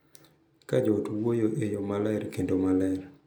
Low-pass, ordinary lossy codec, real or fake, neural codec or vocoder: none; none; real; none